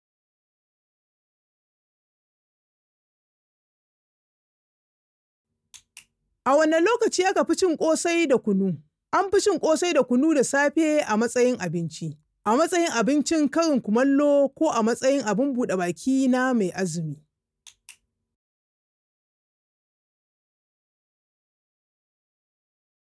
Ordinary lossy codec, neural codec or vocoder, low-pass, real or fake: none; none; none; real